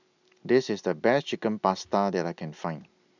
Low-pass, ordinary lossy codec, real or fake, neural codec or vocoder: 7.2 kHz; none; real; none